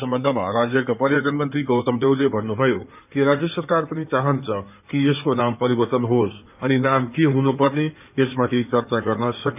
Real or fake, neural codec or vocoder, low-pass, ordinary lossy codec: fake; codec, 16 kHz in and 24 kHz out, 2.2 kbps, FireRedTTS-2 codec; 3.6 kHz; none